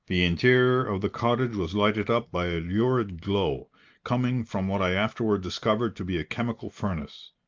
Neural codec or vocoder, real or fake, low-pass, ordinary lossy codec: none; real; 7.2 kHz; Opus, 24 kbps